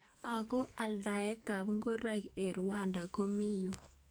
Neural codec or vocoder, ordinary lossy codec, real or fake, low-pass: codec, 44.1 kHz, 2.6 kbps, SNAC; none; fake; none